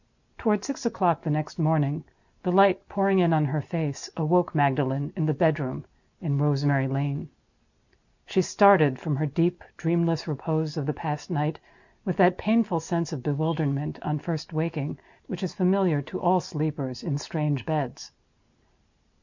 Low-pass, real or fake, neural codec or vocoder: 7.2 kHz; real; none